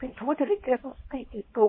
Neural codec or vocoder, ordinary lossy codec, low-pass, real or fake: codec, 24 kHz, 0.9 kbps, WavTokenizer, small release; MP3, 24 kbps; 5.4 kHz; fake